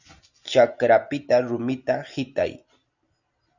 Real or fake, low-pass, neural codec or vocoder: real; 7.2 kHz; none